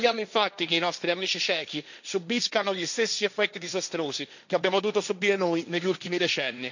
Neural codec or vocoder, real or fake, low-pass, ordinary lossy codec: codec, 16 kHz, 1.1 kbps, Voila-Tokenizer; fake; 7.2 kHz; none